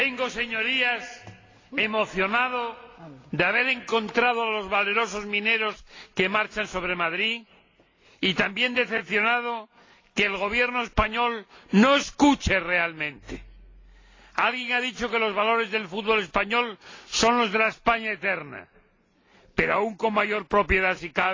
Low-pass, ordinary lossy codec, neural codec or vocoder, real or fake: 7.2 kHz; AAC, 32 kbps; none; real